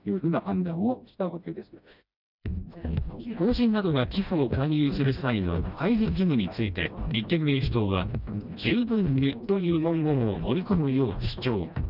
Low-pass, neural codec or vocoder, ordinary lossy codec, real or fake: 5.4 kHz; codec, 16 kHz, 1 kbps, FreqCodec, smaller model; none; fake